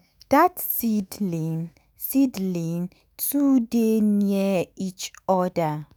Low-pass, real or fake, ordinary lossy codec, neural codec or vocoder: none; fake; none; autoencoder, 48 kHz, 128 numbers a frame, DAC-VAE, trained on Japanese speech